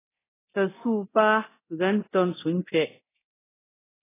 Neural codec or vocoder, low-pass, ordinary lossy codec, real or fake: codec, 24 kHz, 0.9 kbps, DualCodec; 3.6 kHz; AAC, 16 kbps; fake